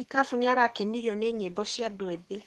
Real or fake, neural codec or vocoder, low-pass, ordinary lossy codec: fake; codec, 32 kHz, 1.9 kbps, SNAC; 14.4 kHz; Opus, 24 kbps